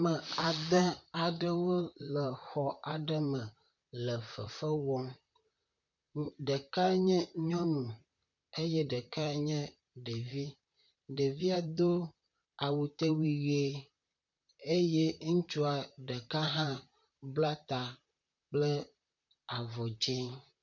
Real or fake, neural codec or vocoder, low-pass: fake; vocoder, 44.1 kHz, 128 mel bands, Pupu-Vocoder; 7.2 kHz